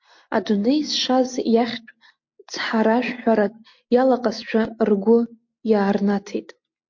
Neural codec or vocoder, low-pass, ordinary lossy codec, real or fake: none; 7.2 kHz; MP3, 48 kbps; real